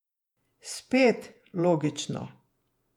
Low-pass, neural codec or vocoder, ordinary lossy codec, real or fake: 19.8 kHz; vocoder, 48 kHz, 128 mel bands, Vocos; none; fake